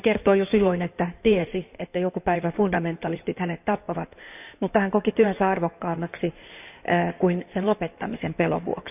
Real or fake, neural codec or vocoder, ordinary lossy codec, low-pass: fake; codec, 16 kHz in and 24 kHz out, 2.2 kbps, FireRedTTS-2 codec; none; 3.6 kHz